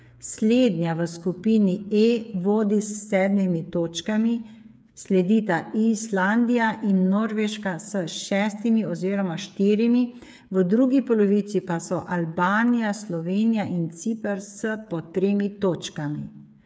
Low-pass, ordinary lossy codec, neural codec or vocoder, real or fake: none; none; codec, 16 kHz, 8 kbps, FreqCodec, smaller model; fake